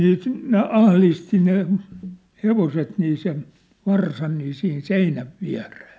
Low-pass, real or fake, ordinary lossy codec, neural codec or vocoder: none; real; none; none